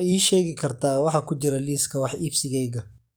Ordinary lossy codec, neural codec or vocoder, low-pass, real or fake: none; codec, 44.1 kHz, 7.8 kbps, DAC; none; fake